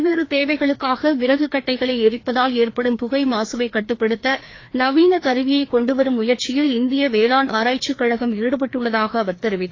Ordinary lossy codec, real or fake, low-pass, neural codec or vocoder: AAC, 32 kbps; fake; 7.2 kHz; codec, 16 kHz, 2 kbps, FreqCodec, larger model